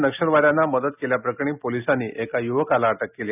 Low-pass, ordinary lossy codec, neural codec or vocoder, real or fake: 3.6 kHz; none; none; real